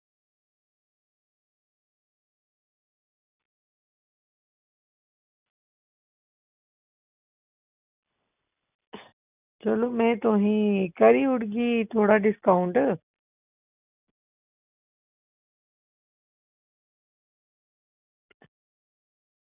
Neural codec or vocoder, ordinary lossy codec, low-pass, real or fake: none; none; 3.6 kHz; real